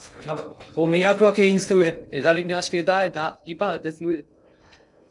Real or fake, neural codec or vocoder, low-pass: fake; codec, 16 kHz in and 24 kHz out, 0.6 kbps, FocalCodec, streaming, 2048 codes; 10.8 kHz